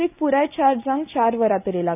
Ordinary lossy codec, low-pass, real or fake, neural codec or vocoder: none; 3.6 kHz; real; none